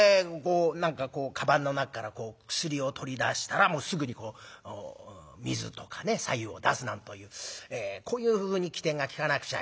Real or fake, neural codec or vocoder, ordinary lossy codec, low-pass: real; none; none; none